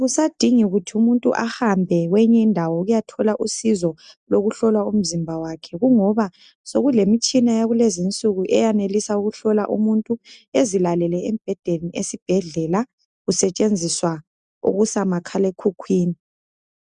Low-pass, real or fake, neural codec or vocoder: 9.9 kHz; real; none